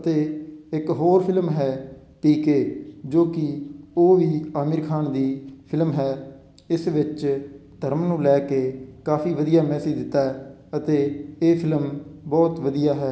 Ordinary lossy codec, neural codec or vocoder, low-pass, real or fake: none; none; none; real